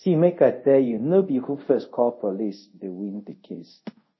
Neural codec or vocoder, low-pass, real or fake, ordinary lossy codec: codec, 24 kHz, 0.5 kbps, DualCodec; 7.2 kHz; fake; MP3, 24 kbps